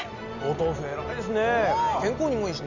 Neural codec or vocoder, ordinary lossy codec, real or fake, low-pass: none; none; real; 7.2 kHz